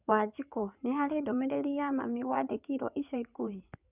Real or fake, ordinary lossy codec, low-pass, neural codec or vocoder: fake; none; 3.6 kHz; codec, 16 kHz in and 24 kHz out, 2.2 kbps, FireRedTTS-2 codec